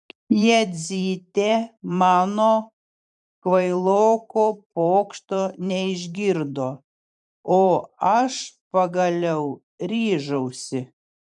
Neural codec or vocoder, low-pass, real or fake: vocoder, 44.1 kHz, 128 mel bands every 512 samples, BigVGAN v2; 10.8 kHz; fake